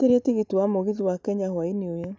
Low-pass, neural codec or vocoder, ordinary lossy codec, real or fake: none; none; none; real